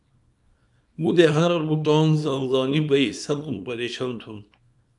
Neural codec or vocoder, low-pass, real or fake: codec, 24 kHz, 0.9 kbps, WavTokenizer, small release; 10.8 kHz; fake